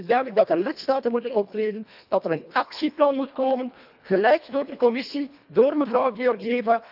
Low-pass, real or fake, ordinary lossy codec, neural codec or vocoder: 5.4 kHz; fake; AAC, 48 kbps; codec, 24 kHz, 1.5 kbps, HILCodec